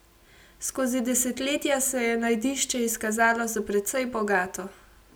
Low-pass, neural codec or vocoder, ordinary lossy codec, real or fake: none; none; none; real